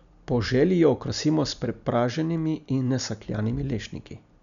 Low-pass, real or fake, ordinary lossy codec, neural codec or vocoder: 7.2 kHz; real; none; none